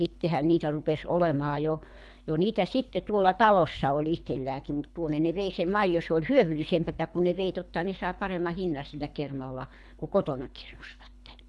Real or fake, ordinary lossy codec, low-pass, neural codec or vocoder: fake; none; none; codec, 24 kHz, 3 kbps, HILCodec